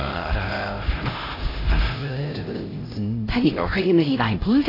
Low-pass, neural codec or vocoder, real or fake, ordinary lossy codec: 5.4 kHz; codec, 16 kHz, 0.5 kbps, X-Codec, HuBERT features, trained on LibriSpeech; fake; AAC, 24 kbps